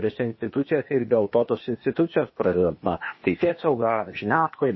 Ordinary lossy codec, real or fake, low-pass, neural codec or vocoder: MP3, 24 kbps; fake; 7.2 kHz; codec, 16 kHz, 0.8 kbps, ZipCodec